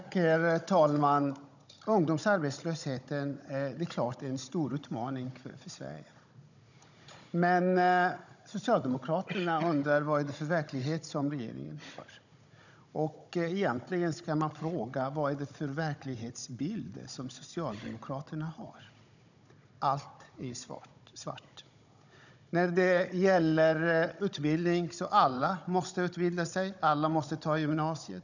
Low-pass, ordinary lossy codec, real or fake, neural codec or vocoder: 7.2 kHz; none; fake; codec, 16 kHz, 16 kbps, FunCodec, trained on Chinese and English, 50 frames a second